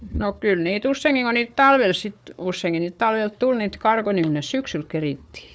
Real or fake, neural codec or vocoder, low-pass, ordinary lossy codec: fake; codec, 16 kHz, 4 kbps, FunCodec, trained on Chinese and English, 50 frames a second; none; none